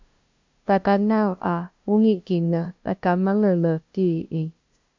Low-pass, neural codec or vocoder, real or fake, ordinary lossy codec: 7.2 kHz; codec, 16 kHz, 0.5 kbps, FunCodec, trained on LibriTTS, 25 frames a second; fake; MP3, 64 kbps